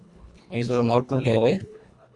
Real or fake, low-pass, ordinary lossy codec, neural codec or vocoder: fake; 10.8 kHz; Opus, 64 kbps; codec, 24 kHz, 1.5 kbps, HILCodec